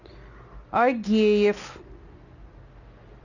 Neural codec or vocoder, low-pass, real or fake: none; 7.2 kHz; real